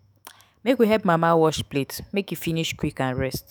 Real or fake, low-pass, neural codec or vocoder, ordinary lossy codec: fake; none; autoencoder, 48 kHz, 128 numbers a frame, DAC-VAE, trained on Japanese speech; none